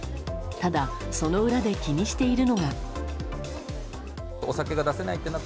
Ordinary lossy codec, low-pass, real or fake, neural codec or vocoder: none; none; real; none